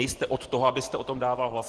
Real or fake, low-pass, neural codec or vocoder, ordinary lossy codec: real; 10.8 kHz; none; Opus, 16 kbps